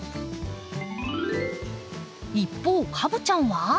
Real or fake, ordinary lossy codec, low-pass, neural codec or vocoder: real; none; none; none